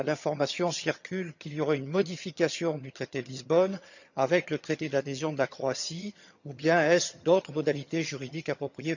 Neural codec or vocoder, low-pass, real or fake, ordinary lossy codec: vocoder, 22.05 kHz, 80 mel bands, HiFi-GAN; 7.2 kHz; fake; none